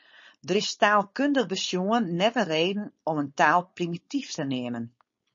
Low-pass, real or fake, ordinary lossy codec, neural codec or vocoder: 7.2 kHz; fake; MP3, 32 kbps; codec, 16 kHz, 4.8 kbps, FACodec